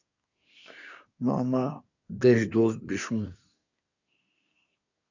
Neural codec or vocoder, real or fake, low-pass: codec, 24 kHz, 1 kbps, SNAC; fake; 7.2 kHz